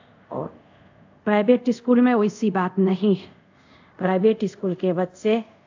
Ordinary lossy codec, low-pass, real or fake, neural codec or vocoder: AAC, 48 kbps; 7.2 kHz; fake; codec, 24 kHz, 0.5 kbps, DualCodec